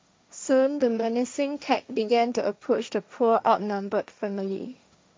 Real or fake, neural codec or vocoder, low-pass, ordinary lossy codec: fake; codec, 16 kHz, 1.1 kbps, Voila-Tokenizer; none; none